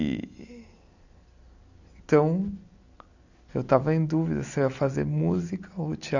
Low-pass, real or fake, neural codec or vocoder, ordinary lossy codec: 7.2 kHz; real; none; none